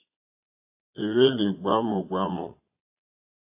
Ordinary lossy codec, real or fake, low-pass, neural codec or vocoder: AAC, 32 kbps; fake; 3.6 kHz; vocoder, 22.05 kHz, 80 mel bands, Vocos